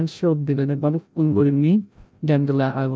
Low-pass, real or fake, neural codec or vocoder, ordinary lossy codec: none; fake; codec, 16 kHz, 0.5 kbps, FreqCodec, larger model; none